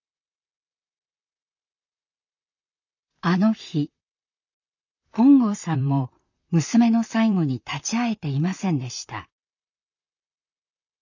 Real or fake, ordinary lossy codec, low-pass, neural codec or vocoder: fake; none; 7.2 kHz; vocoder, 44.1 kHz, 128 mel bands, Pupu-Vocoder